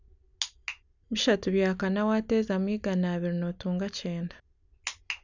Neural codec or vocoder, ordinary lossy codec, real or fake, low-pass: none; none; real; 7.2 kHz